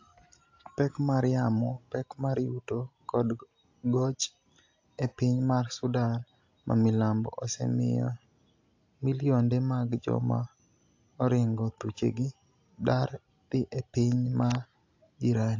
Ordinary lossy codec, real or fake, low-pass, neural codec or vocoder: AAC, 48 kbps; real; 7.2 kHz; none